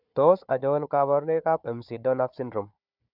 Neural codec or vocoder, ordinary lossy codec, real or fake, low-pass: codec, 16 kHz, 4 kbps, FunCodec, trained on Chinese and English, 50 frames a second; none; fake; 5.4 kHz